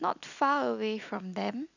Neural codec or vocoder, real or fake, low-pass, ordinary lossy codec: none; real; 7.2 kHz; none